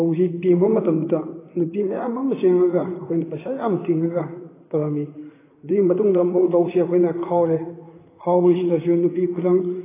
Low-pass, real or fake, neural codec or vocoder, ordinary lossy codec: 3.6 kHz; fake; vocoder, 44.1 kHz, 128 mel bands, Pupu-Vocoder; AAC, 16 kbps